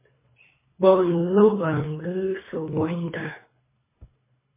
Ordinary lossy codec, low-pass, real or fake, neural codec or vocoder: MP3, 16 kbps; 3.6 kHz; fake; codec, 24 kHz, 3 kbps, HILCodec